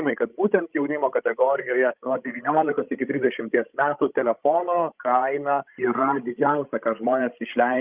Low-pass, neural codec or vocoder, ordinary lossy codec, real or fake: 3.6 kHz; codec, 16 kHz, 16 kbps, FreqCodec, larger model; Opus, 32 kbps; fake